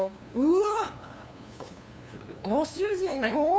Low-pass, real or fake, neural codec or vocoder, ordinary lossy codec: none; fake; codec, 16 kHz, 2 kbps, FunCodec, trained on LibriTTS, 25 frames a second; none